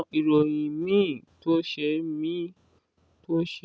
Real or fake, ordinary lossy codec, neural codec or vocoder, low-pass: real; none; none; none